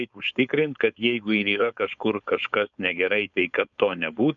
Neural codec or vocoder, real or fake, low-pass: codec, 16 kHz, 4.8 kbps, FACodec; fake; 7.2 kHz